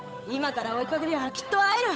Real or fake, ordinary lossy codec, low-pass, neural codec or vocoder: fake; none; none; codec, 16 kHz, 8 kbps, FunCodec, trained on Chinese and English, 25 frames a second